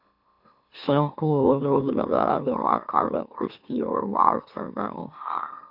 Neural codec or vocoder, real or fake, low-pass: autoencoder, 44.1 kHz, a latent of 192 numbers a frame, MeloTTS; fake; 5.4 kHz